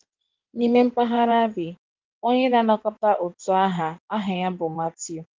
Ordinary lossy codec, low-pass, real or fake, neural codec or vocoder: Opus, 24 kbps; 7.2 kHz; fake; codec, 16 kHz in and 24 kHz out, 2.2 kbps, FireRedTTS-2 codec